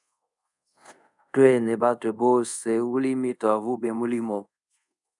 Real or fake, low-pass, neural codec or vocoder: fake; 10.8 kHz; codec, 24 kHz, 0.5 kbps, DualCodec